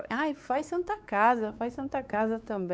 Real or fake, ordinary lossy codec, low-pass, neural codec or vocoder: fake; none; none; codec, 16 kHz, 4 kbps, X-Codec, WavLM features, trained on Multilingual LibriSpeech